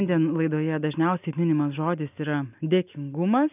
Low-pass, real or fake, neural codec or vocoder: 3.6 kHz; real; none